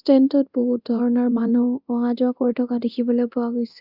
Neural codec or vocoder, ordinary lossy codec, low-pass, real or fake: codec, 24 kHz, 0.9 kbps, DualCodec; Opus, 64 kbps; 5.4 kHz; fake